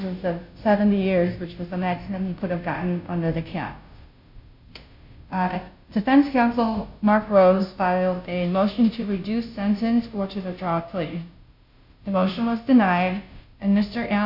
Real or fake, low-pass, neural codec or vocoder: fake; 5.4 kHz; codec, 16 kHz, 0.5 kbps, FunCodec, trained on Chinese and English, 25 frames a second